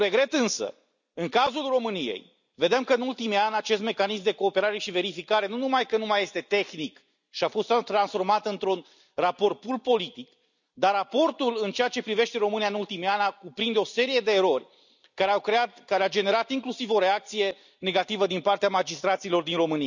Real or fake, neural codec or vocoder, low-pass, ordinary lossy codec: real; none; 7.2 kHz; none